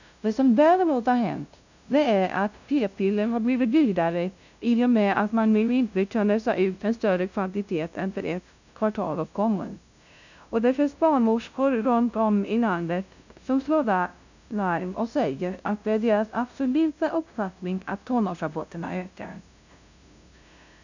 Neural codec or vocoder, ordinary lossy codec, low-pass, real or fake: codec, 16 kHz, 0.5 kbps, FunCodec, trained on LibriTTS, 25 frames a second; none; 7.2 kHz; fake